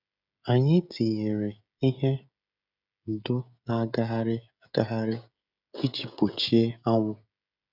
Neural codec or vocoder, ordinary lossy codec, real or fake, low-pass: codec, 16 kHz, 16 kbps, FreqCodec, smaller model; none; fake; 5.4 kHz